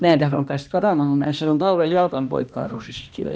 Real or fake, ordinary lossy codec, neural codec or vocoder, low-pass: fake; none; codec, 16 kHz, 1 kbps, X-Codec, HuBERT features, trained on balanced general audio; none